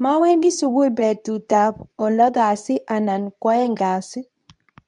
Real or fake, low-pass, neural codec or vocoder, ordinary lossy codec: fake; 10.8 kHz; codec, 24 kHz, 0.9 kbps, WavTokenizer, medium speech release version 2; none